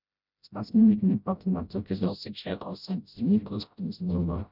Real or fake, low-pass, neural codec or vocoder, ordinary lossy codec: fake; 5.4 kHz; codec, 16 kHz, 0.5 kbps, FreqCodec, smaller model; none